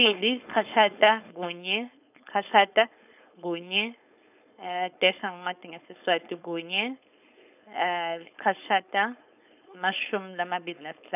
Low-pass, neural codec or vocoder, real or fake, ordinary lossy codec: 3.6 kHz; codec, 16 kHz, 4.8 kbps, FACodec; fake; none